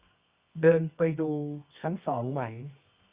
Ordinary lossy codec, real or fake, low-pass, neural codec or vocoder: Opus, 64 kbps; fake; 3.6 kHz; codec, 24 kHz, 0.9 kbps, WavTokenizer, medium music audio release